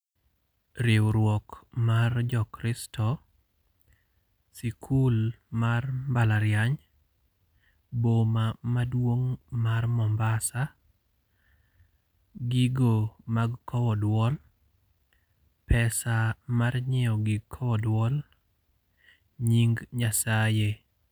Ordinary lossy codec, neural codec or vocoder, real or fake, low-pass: none; none; real; none